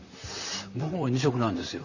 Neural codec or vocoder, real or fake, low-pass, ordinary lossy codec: vocoder, 44.1 kHz, 128 mel bands, Pupu-Vocoder; fake; 7.2 kHz; none